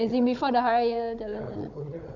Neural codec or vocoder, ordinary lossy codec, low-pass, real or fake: codec, 16 kHz, 16 kbps, FunCodec, trained on Chinese and English, 50 frames a second; MP3, 64 kbps; 7.2 kHz; fake